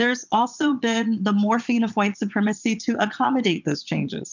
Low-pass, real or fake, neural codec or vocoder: 7.2 kHz; fake; vocoder, 22.05 kHz, 80 mel bands, Vocos